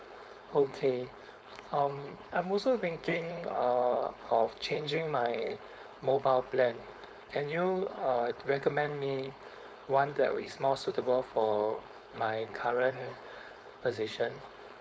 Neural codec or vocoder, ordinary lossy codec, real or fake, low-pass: codec, 16 kHz, 4.8 kbps, FACodec; none; fake; none